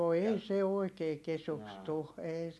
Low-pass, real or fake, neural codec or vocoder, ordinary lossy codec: none; real; none; none